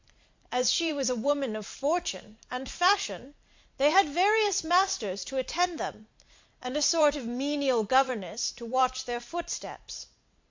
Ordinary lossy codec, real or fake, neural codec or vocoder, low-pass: MP3, 48 kbps; fake; vocoder, 44.1 kHz, 128 mel bands every 512 samples, BigVGAN v2; 7.2 kHz